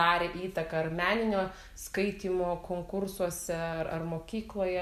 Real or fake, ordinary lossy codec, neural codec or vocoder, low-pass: real; MP3, 96 kbps; none; 14.4 kHz